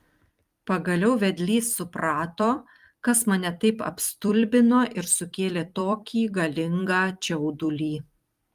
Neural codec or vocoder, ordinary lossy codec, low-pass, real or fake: vocoder, 44.1 kHz, 128 mel bands every 256 samples, BigVGAN v2; Opus, 32 kbps; 14.4 kHz; fake